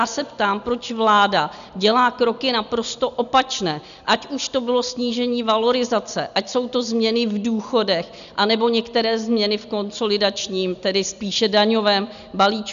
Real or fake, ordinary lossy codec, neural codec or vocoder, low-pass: real; MP3, 96 kbps; none; 7.2 kHz